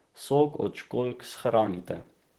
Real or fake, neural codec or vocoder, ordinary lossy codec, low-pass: fake; vocoder, 44.1 kHz, 128 mel bands, Pupu-Vocoder; Opus, 16 kbps; 19.8 kHz